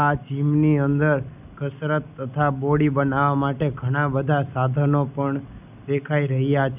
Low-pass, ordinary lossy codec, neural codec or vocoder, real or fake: 3.6 kHz; none; none; real